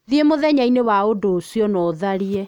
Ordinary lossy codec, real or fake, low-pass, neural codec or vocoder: none; real; 19.8 kHz; none